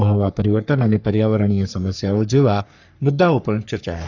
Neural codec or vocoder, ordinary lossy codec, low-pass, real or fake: codec, 44.1 kHz, 3.4 kbps, Pupu-Codec; none; 7.2 kHz; fake